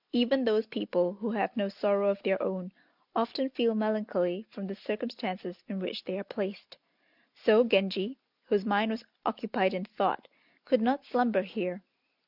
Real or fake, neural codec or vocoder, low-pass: real; none; 5.4 kHz